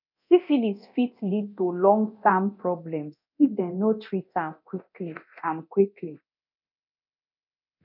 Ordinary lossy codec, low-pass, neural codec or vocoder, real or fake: none; 5.4 kHz; codec, 24 kHz, 0.9 kbps, DualCodec; fake